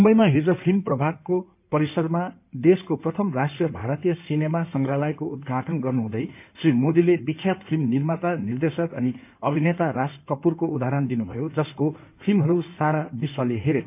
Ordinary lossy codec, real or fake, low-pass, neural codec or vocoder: none; fake; 3.6 kHz; codec, 16 kHz in and 24 kHz out, 2.2 kbps, FireRedTTS-2 codec